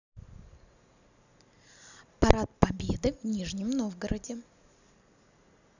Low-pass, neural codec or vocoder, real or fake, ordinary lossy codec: 7.2 kHz; none; real; none